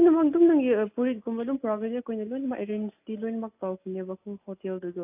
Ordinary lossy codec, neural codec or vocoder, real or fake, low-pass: AAC, 32 kbps; none; real; 3.6 kHz